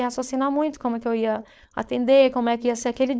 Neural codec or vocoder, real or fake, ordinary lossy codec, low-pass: codec, 16 kHz, 4.8 kbps, FACodec; fake; none; none